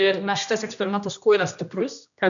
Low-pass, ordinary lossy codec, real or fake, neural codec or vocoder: 7.2 kHz; MP3, 96 kbps; fake; codec, 16 kHz, 1 kbps, X-Codec, HuBERT features, trained on general audio